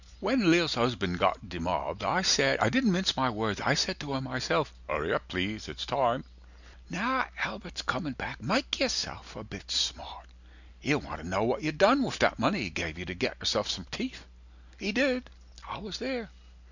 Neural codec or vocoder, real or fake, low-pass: none; real; 7.2 kHz